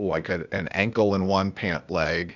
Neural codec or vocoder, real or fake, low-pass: codec, 16 kHz, 0.8 kbps, ZipCodec; fake; 7.2 kHz